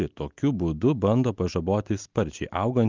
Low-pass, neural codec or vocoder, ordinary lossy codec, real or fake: 7.2 kHz; none; Opus, 32 kbps; real